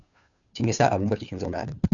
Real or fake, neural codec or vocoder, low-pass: fake; codec, 16 kHz, 2 kbps, FunCodec, trained on Chinese and English, 25 frames a second; 7.2 kHz